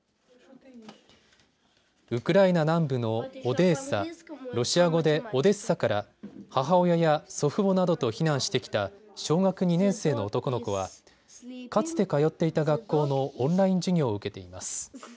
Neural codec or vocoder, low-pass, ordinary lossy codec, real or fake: none; none; none; real